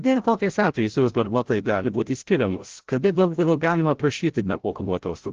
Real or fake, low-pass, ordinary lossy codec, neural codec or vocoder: fake; 7.2 kHz; Opus, 16 kbps; codec, 16 kHz, 0.5 kbps, FreqCodec, larger model